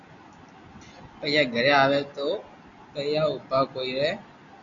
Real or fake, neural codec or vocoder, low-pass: real; none; 7.2 kHz